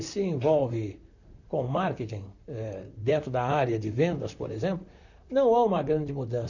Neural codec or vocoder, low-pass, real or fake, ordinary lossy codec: vocoder, 44.1 kHz, 128 mel bands, Pupu-Vocoder; 7.2 kHz; fake; none